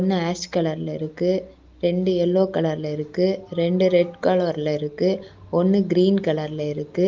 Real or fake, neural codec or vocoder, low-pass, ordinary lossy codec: real; none; 7.2 kHz; Opus, 24 kbps